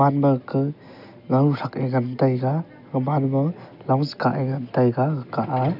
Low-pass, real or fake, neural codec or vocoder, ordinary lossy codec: 5.4 kHz; real; none; none